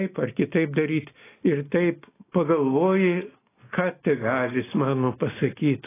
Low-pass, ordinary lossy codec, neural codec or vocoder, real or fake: 3.6 kHz; AAC, 16 kbps; none; real